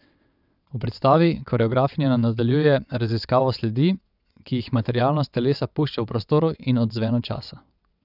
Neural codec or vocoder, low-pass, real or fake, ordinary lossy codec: vocoder, 22.05 kHz, 80 mel bands, WaveNeXt; 5.4 kHz; fake; none